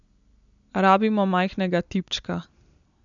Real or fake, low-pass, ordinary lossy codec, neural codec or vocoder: real; 7.2 kHz; none; none